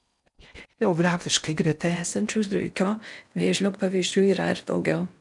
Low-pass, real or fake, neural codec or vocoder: 10.8 kHz; fake; codec, 16 kHz in and 24 kHz out, 0.6 kbps, FocalCodec, streaming, 4096 codes